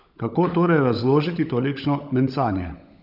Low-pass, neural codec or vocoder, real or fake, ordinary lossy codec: 5.4 kHz; codec, 16 kHz, 16 kbps, FunCodec, trained on LibriTTS, 50 frames a second; fake; none